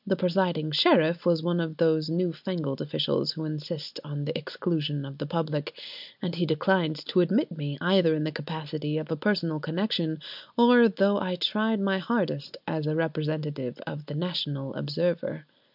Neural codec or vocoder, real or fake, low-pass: none; real; 5.4 kHz